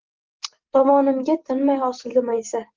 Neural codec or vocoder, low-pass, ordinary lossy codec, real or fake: none; 7.2 kHz; Opus, 16 kbps; real